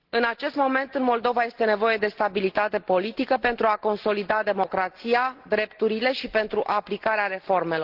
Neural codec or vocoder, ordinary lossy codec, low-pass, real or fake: none; Opus, 16 kbps; 5.4 kHz; real